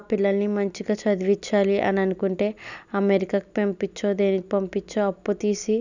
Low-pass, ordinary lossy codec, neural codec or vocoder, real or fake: 7.2 kHz; none; none; real